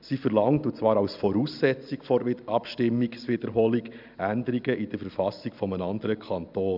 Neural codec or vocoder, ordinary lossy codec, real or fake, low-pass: none; none; real; 5.4 kHz